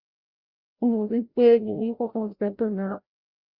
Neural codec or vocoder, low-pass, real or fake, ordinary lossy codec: codec, 16 kHz, 0.5 kbps, FreqCodec, larger model; 5.4 kHz; fake; Opus, 64 kbps